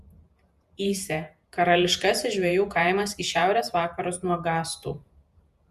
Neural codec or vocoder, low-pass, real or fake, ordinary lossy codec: vocoder, 48 kHz, 128 mel bands, Vocos; 14.4 kHz; fake; Opus, 64 kbps